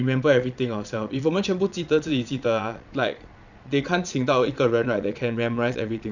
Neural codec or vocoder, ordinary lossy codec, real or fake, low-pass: vocoder, 22.05 kHz, 80 mel bands, Vocos; none; fake; 7.2 kHz